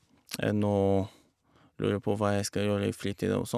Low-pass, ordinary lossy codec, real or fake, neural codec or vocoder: 14.4 kHz; none; real; none